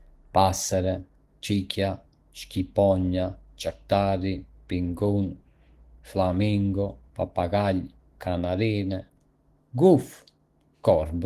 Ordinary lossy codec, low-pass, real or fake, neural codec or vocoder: Opus, 16 kbps; 14.4 kHz; fake; autoencoder, 48 kHz, 128 numbers a frame, DAC-VAE, trained on Japanese speech